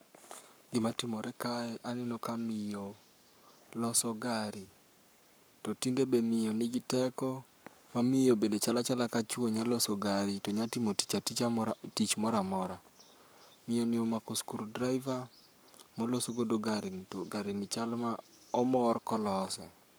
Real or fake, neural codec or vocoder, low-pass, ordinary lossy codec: fake; codec, 44.1 kHz, 7.8 kbps, Pupu-Codec; none; none